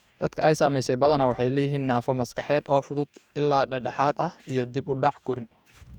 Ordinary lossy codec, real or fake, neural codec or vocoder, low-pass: none; fake; codec, 44.1 kHz, 2.6 kbps, DAC; 19.8 kHz